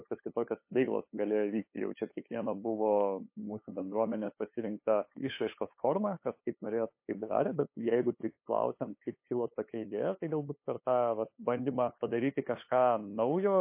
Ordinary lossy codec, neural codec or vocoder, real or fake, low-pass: MP3, 24 kbps; codec, 16 kHz, 8 kbps, FunCodec, trained on LibriTTS, 25 frames a second; fake; 3.6 kHz